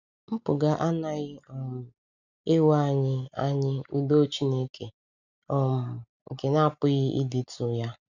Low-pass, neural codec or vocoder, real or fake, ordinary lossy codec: 7.2 kHz; none; real; none